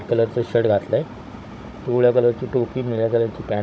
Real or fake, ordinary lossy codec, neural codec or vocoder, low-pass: fake; none; codec, 16 kHz, 16 kbps, FunCodec, trained on Chinese and English, 50 frames a second; none